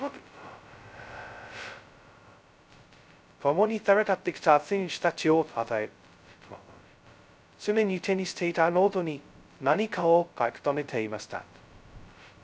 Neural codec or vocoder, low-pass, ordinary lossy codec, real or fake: codec, 16 kHz, 0.2 kbps, FocalCodec; none; none; fake